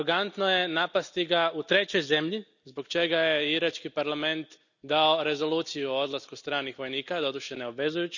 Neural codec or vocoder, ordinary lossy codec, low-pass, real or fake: none; none; 7.2 kHz; real